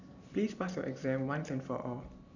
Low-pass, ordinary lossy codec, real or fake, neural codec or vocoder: 7.2 kHz; none; real; none